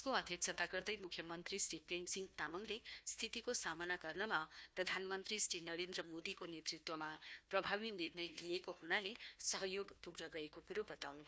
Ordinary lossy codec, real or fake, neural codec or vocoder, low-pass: none; fake; codec, 16 kHz, 1 kbps, FunCodec, trained on Chinese and English, 50 frames a second; none